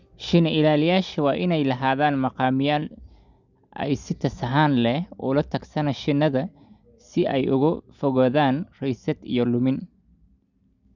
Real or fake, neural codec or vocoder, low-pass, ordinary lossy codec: real; none; 7.2 kHz; none